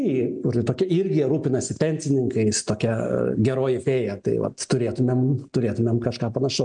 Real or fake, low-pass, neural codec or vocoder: real; 9.9 kHz; none